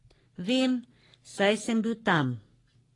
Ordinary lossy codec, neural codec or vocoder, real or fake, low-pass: AAC, 32 kbps; codec, 44.1 kHz, 3.4 kbps, Pupu-Codec; fake; 10.8 kHz